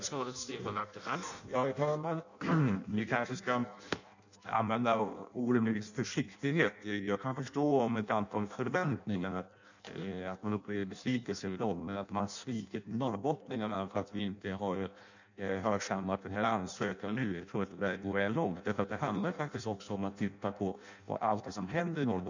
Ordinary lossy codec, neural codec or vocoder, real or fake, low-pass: none; codec, 16 kHz in and 24 kHz out, 0.6 kbps, FireRedTTS-2 codec; fake; 7.2 kHz